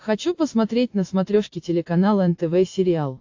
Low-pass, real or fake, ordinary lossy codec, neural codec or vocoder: 7.2 kHz; real; AAC, 48 kbps; none